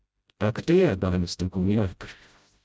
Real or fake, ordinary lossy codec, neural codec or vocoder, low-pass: fake; none; codec, 16 kHz, 0.5 kbps, FreqCodec, smaller model; none